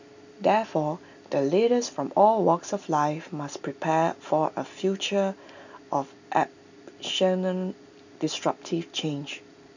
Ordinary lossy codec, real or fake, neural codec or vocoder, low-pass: none; real; none; 7.2 kHz